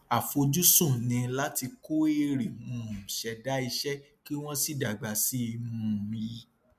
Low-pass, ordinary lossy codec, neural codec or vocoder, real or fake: 14.4 kHz; MP3, 96 kbps; none; real